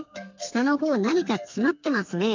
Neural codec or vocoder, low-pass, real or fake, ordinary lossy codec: codec, 32 kHz, 1.9 kbps, SNAC; 7.2 kHz; fake; MP3, 64 kbps